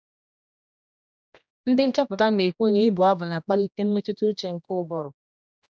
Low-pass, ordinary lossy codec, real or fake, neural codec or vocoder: none; none; fake; codec, 16 kHz, 1 kbps, X-Codec, HuBERT features, trained on general audio